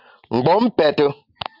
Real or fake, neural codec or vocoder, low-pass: real; none; 5.4 kHz